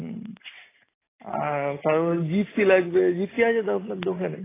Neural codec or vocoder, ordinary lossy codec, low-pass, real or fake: none; AAC, 16 kbps; 3.6 kHz; real